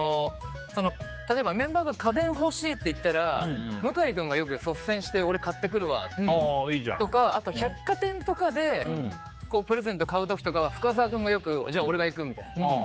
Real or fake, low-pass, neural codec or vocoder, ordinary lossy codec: fake; none; codec, 16 kHz, 4 kbps, X-Codec, HuBERT features, trained on general audio; none